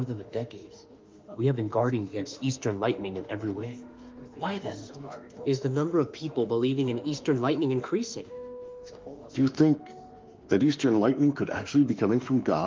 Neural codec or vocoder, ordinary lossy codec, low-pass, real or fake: autoencoder, 48 kHz, 32 numbers a frame, DAC-VAE, trained on Japanese speech; Opus, 24 kbps; 7.2 kHz; fake